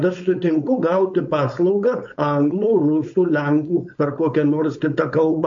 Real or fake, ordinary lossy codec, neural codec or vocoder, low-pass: fake; MP3, 48 kbps; codec, 16 kHz, 4.8 kbps, FACodec; 7.2 kHz